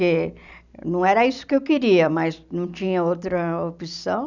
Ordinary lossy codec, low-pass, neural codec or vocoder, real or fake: none; 7.2 kHz; none; real